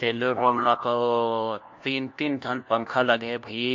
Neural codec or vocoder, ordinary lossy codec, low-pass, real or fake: codec, 16 kHz, 1 kbps, FunCodec, trained on LibriTTS, 50 frames a second; AAC, 48 kbps; 7.2 kHz; fake